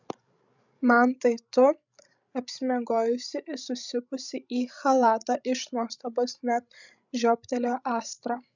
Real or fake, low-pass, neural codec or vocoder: fake; 7.2 kHz; codec, 16 kHz, 16 kbps, FreqCodec, larger model